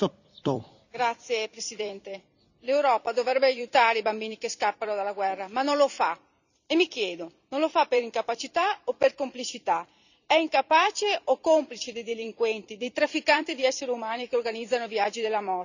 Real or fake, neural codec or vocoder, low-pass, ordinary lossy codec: fake; vocoder, 44.1 kHz, 128 mel bands every 256 samples, BigVGAN v2; 7.2 kHz; none